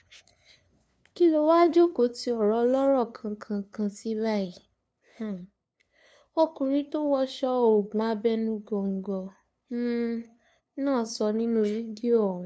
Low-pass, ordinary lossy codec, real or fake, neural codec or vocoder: none; none; fake; codec, 16 kHz, 2 kbps, FunCodec, trained on LibriTTS, 25 frames a second